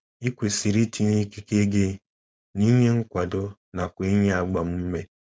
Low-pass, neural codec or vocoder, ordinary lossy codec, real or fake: none; codec, 16 kHz, 4.8 kbps, FACodec; none; fake